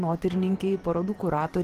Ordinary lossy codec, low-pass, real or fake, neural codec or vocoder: Opus, 16 kbps; 14.4 kHz; fake; vocoder, 44.1 kHz, 128 mel bands every 512 samples, BigVGAN v2